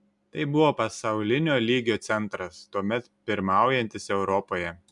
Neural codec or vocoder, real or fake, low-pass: none; real; 10.8 kHz